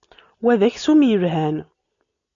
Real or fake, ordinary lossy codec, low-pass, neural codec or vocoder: real; MP3, 96 kbps; 7.2 kHz; none